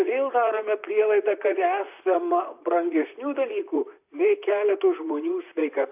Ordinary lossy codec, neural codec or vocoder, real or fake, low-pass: AAC, 32 kbps; vocoder, 44.1 kHz, 128 mel bands, Pupu-Vocoder; fake; 3.6 kHz